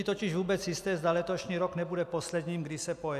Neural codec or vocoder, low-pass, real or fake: none; 14.4 kHz; real